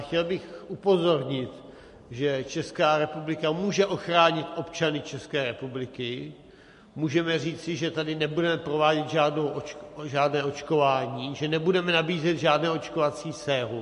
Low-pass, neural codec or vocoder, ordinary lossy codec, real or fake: 14.4 kHz; none; MP3, 48 kbps; real